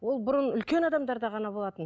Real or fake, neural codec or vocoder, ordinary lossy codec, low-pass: real; none; none; none